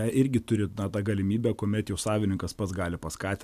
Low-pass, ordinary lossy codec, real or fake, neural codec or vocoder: 14.4 kHz; AAC, 96 kbps; real; none